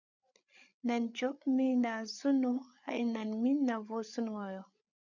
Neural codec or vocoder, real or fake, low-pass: codec, 16 kHz, 8 kbps, FreqCodec, larger model; fake; 7.2 kHz